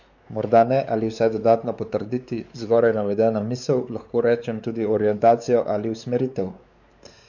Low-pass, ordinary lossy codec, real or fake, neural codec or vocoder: 7.2 kHz; none; fake; codec, 16 kHz, 4 kbps, X-Codec, WavLM features, trained on Multilingual LibriSpeech